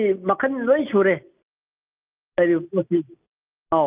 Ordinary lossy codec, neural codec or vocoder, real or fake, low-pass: Opus, 32 kbps; none; real; 3.6 kHz